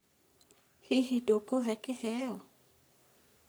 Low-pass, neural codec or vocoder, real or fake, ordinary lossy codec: none; codec, 44.1 kHz, 3.4 kbps, Pupu-Codec; fake; none